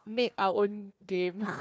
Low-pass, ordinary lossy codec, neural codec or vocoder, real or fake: none; none; codec, 16 kHz, 1 kbps, FunCodec, trained on Chinese and English, 50 frames a second; fake